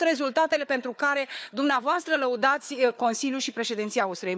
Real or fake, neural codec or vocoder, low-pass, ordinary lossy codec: fake; codec, 16 kHz, 4 kbps, FunCodec, trained on Chinese and English, 50 frames a second; none; none